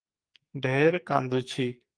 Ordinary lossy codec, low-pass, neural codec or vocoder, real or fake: Opus, 32 kbps; 9.9 kHz; codec, 44.1 kHz, 2.6 kbps, SNAC; fake